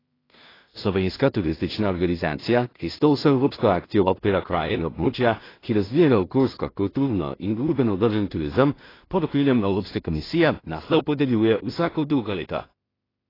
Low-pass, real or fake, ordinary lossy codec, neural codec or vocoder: 5.4 kHz; fake; AAC, 24 kbps; codec, 16 kHz in and 24 kHz out, 0.4 kbps, LongCat-Audio-Codec, two codebook decoder